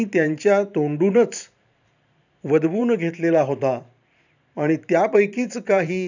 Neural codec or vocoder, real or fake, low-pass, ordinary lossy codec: vocoder, 44.1 kHz, 128 mel bands every 512 samples, BigVGAN v2; fake; 7.2 kHz; none